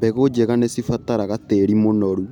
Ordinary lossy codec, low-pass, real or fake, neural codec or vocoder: none; 19.8 kHz; real; none